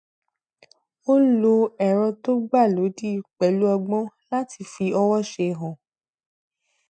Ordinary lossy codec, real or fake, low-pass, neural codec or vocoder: MP3, 96 kbps; real; 9.9 kHz; none